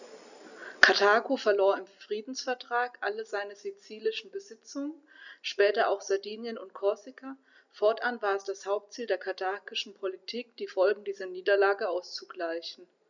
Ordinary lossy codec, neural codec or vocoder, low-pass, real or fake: none; none; 7.2 kHz; real